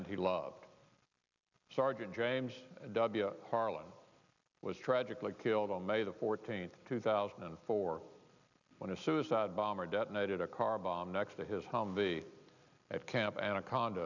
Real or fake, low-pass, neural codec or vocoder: real; 7.2 kHz; none